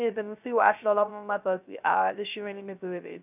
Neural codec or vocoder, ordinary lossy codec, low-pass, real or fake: codec, 16 kHz, 0.3 kbps, FocalCodec; none; 3.6 kHz; fake